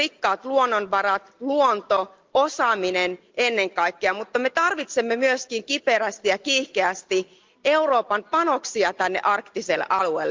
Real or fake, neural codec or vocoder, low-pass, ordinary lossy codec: real; none; 7.2 kHz; Opus, 24 kbps